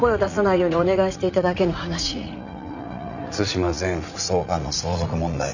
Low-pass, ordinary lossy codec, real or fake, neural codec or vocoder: 7.2 kHz; none; fake; vocoder, 44.1 kHz, 80 mel bands, Vocos